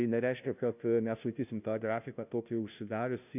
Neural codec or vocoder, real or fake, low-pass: codec, 16 kHz, 0.5 kbps, FunCodec, trained on Chinese and English, 25 frames a second; fake; 3.6 kHz